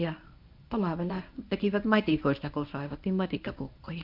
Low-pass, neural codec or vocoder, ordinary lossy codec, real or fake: 5.4 kHz; codec, 24 kHz, 0.9 kbps, WavTokenizer, medium speech release version 1; MP3, 48 kbps; fake